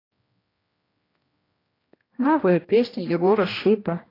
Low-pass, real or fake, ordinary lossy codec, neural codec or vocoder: 5.4 kHz; fake; AAC, 24 kbps; codec, 16 kHz, 1 kbps, X-Codec, HuBERT features, trained on general audio